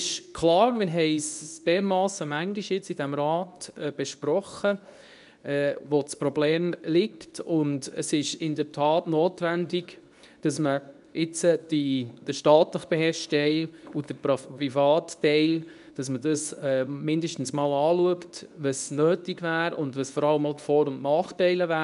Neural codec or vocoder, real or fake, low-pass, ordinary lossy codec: codec, 24 kHz, 0.9 kbps, WavTokenizer, medium speech release version 2; fake; 10.8 kHz; none